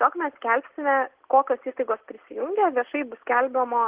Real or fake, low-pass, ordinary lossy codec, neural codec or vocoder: real; 3.6 kHz; Opus, 16 kbps; none